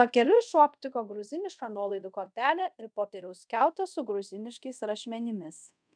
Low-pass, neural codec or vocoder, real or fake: 9.9 kHz; codec, 24 kHz, 0.5 kbps, DualCodec; fake